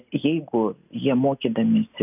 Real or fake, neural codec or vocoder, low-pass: real; none; 3.6 kHz